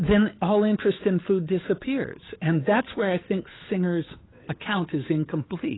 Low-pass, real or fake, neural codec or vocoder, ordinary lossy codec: 7.2 kHz; fake; codec, 16 kHz, 8 kbps, FunCodec, trained on Chinese and English, 25 frames a second; AAC, 16 kbps